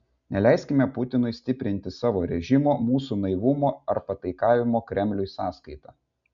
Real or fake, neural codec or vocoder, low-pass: real; none; 7.2 kHz